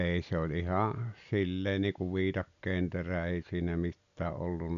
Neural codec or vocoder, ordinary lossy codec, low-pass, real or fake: none; AAC, 96 kbps; 7.2 kHz; real